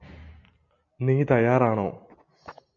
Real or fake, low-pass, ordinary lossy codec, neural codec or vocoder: real; 7.2 kHz; MP3, 48 kbps; none